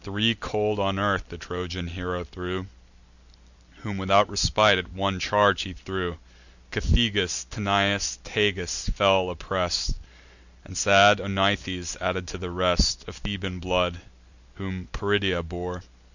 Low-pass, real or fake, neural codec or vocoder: 7.2 kHz; real; none